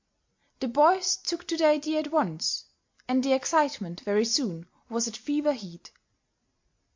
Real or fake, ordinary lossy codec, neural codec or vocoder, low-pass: real; MP3, 48 kbps; none; 7.2 kHz